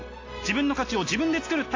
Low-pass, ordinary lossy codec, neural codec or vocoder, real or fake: 7.2 kHz; AAC, 32 kbps; none; real